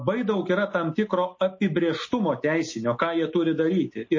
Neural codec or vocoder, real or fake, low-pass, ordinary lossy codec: none; real; 7.2 kHz; MP3, 32 kbps